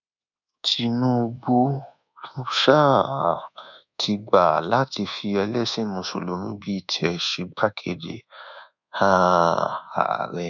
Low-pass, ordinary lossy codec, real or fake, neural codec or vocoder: 7.2 kHz; none; fake; codec, 24 kHz, 1.2 kbps, DualCodec